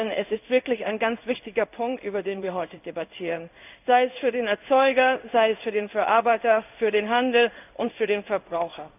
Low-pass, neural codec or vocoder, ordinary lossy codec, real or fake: 3.6 kHz; codec, 16 kHz in and 24 kHz out, 1 kbps, XY-Tokenizer; none; fake